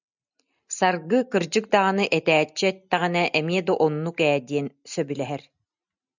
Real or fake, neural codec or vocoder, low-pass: real; none; 7.2 kHz